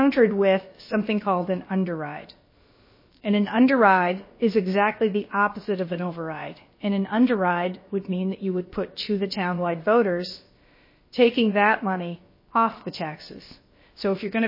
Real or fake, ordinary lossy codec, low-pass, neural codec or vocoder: fake; MP3, 24 kbps; 5.4 kHz; codec, 16 kHz, about 1 kbps, DyCAST, with the encoder's durations